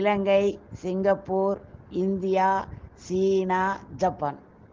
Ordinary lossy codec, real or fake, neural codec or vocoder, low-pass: Opus, 16 kbps; real; none; 7.2 kHz